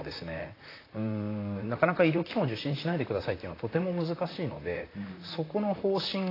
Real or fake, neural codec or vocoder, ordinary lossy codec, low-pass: fake; vocoder, 44.1 kHz, 128 mel bands, Pupu-Vocoder; AAC, 24 kbps; 5.4 kHz